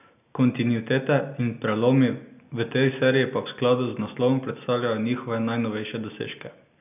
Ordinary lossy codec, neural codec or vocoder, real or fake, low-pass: none; none; real; 3.6 kHz